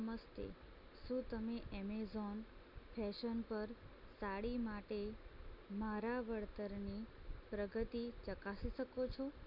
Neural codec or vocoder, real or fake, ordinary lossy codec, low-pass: none; real; none; 5.4 kHz